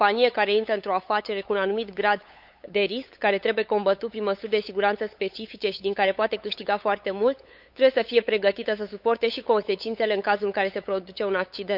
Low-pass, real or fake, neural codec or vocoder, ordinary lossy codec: 5.4 kHz; fake; codec, 16 kHz, 8 kbps, FunCodec, trained on LibriTTS, 25 frames a second; none